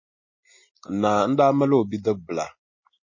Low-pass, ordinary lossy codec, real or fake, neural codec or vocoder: 7.2 kHz; MP3, 32 kbps; real; none